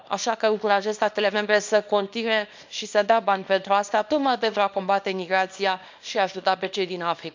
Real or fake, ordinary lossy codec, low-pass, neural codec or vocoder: fake; MP3, 64 kbps; 7.2 kHz; codec, 24 kHz, 0.9 kbps, WavTokenizer, small release